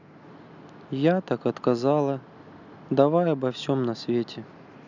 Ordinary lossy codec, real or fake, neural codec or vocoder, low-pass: none; real; none; 7.2 kHz